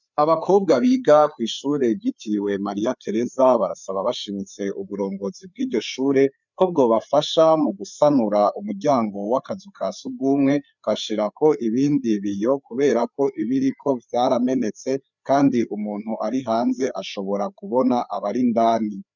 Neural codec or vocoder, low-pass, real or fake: codec, 16 kHz, 4 kbps, FreqCodec, larger model; 7.2 kHz; fake